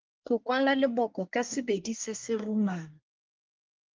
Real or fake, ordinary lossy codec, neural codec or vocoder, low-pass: fake; Opus, 32 kbps; codec, 24 kHz, 1 kbps, SNAC; 7.2 kHz